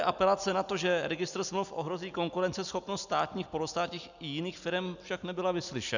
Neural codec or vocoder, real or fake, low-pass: none; real; 7.2 kHz